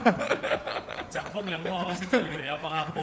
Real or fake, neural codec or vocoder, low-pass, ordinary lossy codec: fake; codec, 16 kHz, 4 kbps, FreqCodec, larger model; none; none